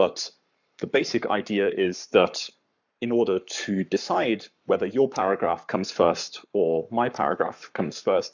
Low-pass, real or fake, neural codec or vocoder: 7.2 kHz; fake; codec, 44.1 kHz, 7.8 kbps, Pupu-Codec